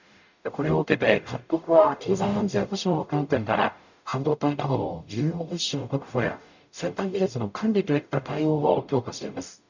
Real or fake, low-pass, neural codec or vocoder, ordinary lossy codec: fake; 7.2 kHz; codec, 44.1 kHz, 0.9 kbps, DAC; none